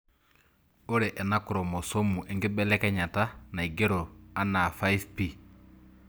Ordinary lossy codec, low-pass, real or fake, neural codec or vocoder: none; none; real; none